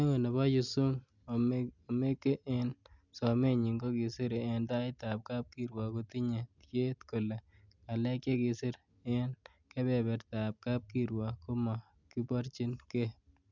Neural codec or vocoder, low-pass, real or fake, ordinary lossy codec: none; 7.2 kHz; real; none